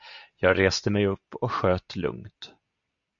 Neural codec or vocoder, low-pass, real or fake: none; 7.2 kHz; real